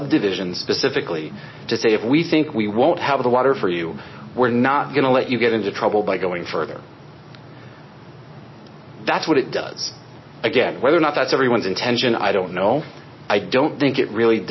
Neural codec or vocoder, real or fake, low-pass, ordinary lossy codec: none; real; 7.2 kHz; MP3, 24 kbps